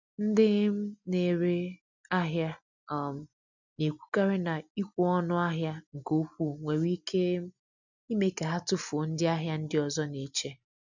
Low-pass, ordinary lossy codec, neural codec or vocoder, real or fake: 7.2 kHz; none; none; real